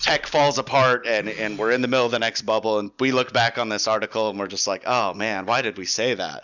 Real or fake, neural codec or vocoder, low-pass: real; none; 7.2 kHz